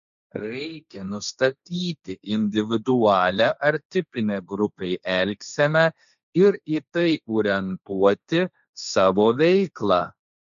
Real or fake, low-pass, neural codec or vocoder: fake; 7.2 kHz; codec, 16 kHz, 1.1 kbps, Voila-Tokenizer